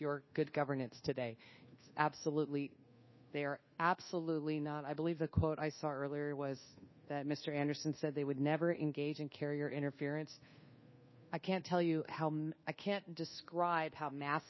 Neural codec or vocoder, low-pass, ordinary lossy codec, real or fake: codec, 24 kHz, 1.2 kbps, DualCodec; 7.2 kHz; MP3, 24 kbps; fake